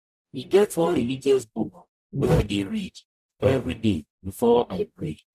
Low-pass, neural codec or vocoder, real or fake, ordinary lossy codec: 14.4 kHz; codec, 44.1 kHz, 0.9 kbps, DAC; fake; none